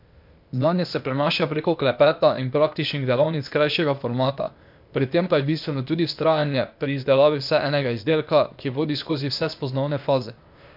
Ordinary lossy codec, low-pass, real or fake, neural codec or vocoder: MP3, 48 kbps; 5.4 kHz; fake; codec, 16 kHz, 0.8 kbps, ZipCodec